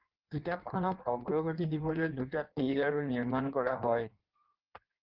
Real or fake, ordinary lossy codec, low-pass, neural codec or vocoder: fake; Opus, 16 kbps; 5.4 kHz; codec, 16 kHz in and 24 kHz out, 1.1 kbps, FireRedTTS-2 codec